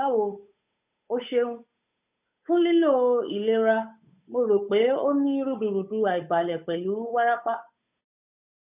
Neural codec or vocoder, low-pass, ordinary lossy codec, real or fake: codec, 16 kHz, 8 kbps, FunCodec, trained on Chinese and English, 25 frames a second; 3.6 kHz; none; fake